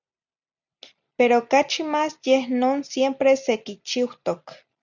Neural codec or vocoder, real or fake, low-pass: none; real; 7.2 kHz